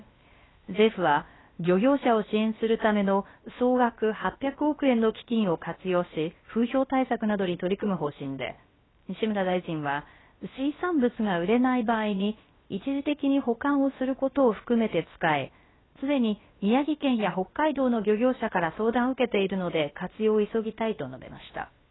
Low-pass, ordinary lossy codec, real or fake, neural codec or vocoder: 7.2 kHz; AAC, 16 kbps; fake; codec, 16 kHz, about 1 kbps, DyCAST, with the encoder's durations